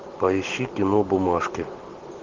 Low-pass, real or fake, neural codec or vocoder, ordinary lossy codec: 7.2 kHz; real; none; Opus, 16 kbps